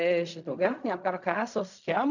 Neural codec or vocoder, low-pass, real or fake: codec, 16 kHz in and 24 kHz out, 0.4 kbps, LongCat-Audio-Codec, fine tuned four codebook decoder; 7.2 kHz; fake